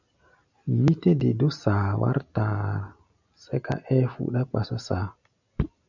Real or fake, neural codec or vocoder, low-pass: real; none; 7.2 kHz